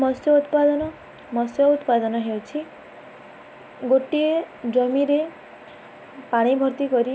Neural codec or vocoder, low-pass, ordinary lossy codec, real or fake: none; none; none; real